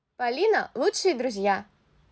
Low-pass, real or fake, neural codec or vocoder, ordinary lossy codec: none; real; none; none